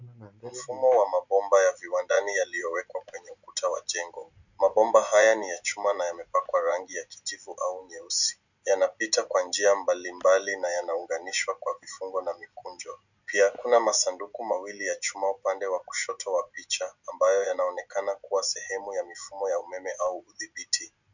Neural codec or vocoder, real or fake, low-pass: none; real; 7.2 kHz